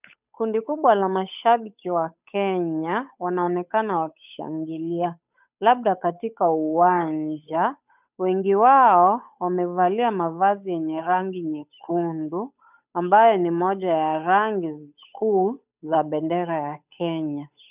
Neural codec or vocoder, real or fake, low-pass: codec, 16 kHz, 8 kbps, FunCodec, trained on Chinese and English, 25 frames a second; fake; 3.6 kHz